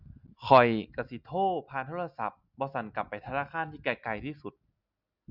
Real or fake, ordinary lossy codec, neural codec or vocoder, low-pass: real; none; none; 5.4 kHz